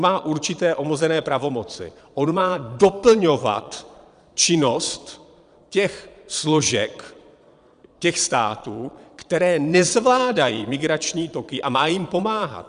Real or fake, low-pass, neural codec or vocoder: fake; 9.9 kHz; vocoder, 22.05 kHz, 80 mel bands, Vocos